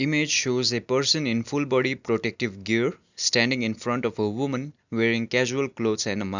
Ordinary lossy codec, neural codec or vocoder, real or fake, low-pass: none; none; real; 7.2 kHz